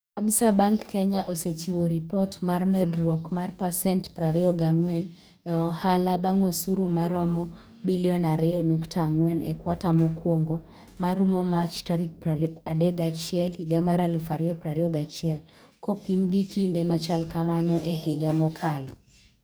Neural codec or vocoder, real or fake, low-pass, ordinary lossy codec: codec, 44.1 kHz, 2.6 kbps, DAC; fake; none; none